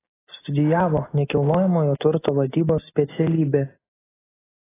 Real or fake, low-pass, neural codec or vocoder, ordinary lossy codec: real; 3.6 kHz; none; AAC, 16 kbps